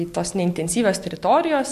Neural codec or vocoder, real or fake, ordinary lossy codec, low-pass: none; real; MP3, 64 kbps; 14.4 kHz